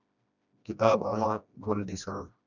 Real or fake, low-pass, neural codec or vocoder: fake; 7.2 kHz; codec, 16 kHz, 1 kbps, FreqCodec, smaller model